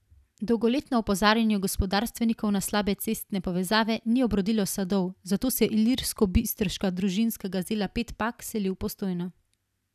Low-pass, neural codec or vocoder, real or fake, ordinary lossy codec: 14.4 kHz; none; real; none